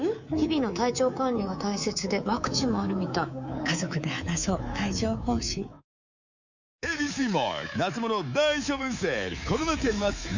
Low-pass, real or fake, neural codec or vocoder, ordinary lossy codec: 7.2 kHz; fake; codec, 24 kHz, 3.1 kbps, DualCodec; Opus, 64 kbps